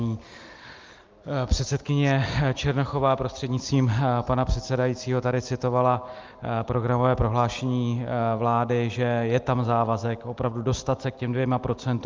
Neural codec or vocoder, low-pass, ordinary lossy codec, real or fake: none; 7.2 kHz; Opus, 32 kbps; real